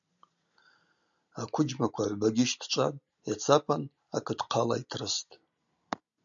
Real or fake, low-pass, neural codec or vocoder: real; 7.2 kHz; none